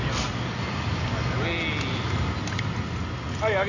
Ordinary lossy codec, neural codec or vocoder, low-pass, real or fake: none; none; 7.2 kHz; real